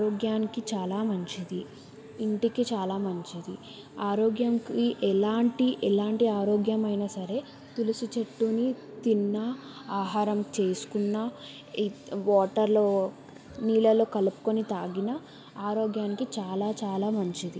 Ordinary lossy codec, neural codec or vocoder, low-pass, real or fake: none; none; none; real